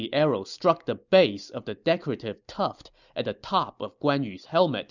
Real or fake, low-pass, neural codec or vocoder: real; 7.2 kHz; none